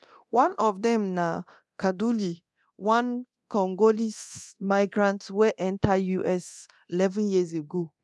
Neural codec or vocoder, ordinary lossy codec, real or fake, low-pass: codec, 24 kHz, 0.9 kbps, DualCodec; none; fake; none